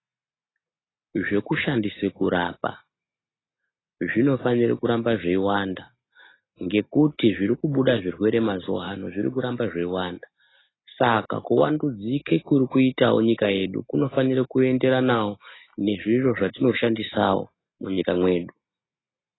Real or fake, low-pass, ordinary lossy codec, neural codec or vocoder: real; 7.2 kHz; AAC, 16 kbps; none